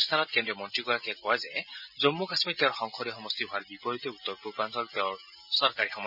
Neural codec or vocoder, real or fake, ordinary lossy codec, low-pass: none; real; none; 5.4 kHz